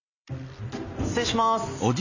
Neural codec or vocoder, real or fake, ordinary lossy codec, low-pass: none; real; none; 7.2 kHz